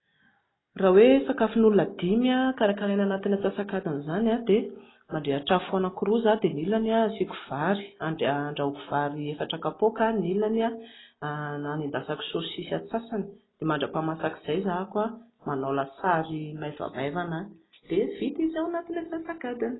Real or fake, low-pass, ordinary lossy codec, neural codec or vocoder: real; 7.2 kHz; AAC, 16 kbps; none